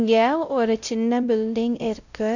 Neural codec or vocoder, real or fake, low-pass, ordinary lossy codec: codec, 16 kHz, 0.5 kbps, X-Codec, WavLM features, trained on Multilingual LibriSpeech; fake; 7.2 kHz; none